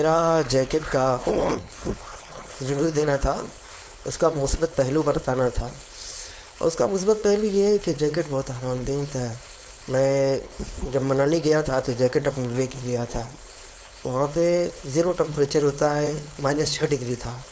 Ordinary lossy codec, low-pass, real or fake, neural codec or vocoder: none; none; fake; codec, 16 kHz, 4.8 kbps, FACodec